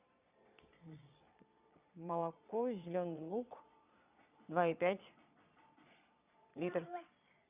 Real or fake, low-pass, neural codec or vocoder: fake; 3.6 kHz; vocoder, 22.05 kHz, 80 mel bands, Vocos